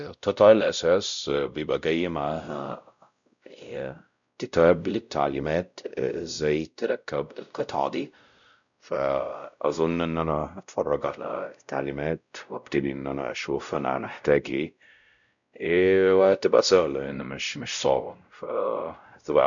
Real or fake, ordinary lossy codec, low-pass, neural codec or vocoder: fake; none; 7.2 kHz; codec, 16 kHz, 0.5 kbps, X-Codec, WavLM features, trained on Multilingual LibriSpeech